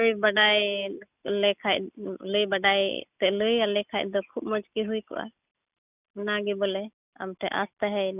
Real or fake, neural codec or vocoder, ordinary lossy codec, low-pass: real; none; none; 3.6 kHz